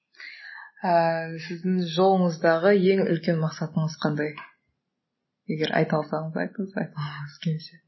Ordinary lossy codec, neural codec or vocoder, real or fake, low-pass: MP3, 24 kbps; none; real; 7.2 kHz